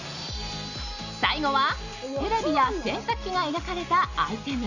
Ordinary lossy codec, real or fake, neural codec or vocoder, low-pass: none; real; none; 7.2 kHz